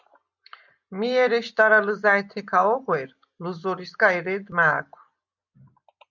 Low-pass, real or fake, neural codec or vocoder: 7.2 kHz; real; none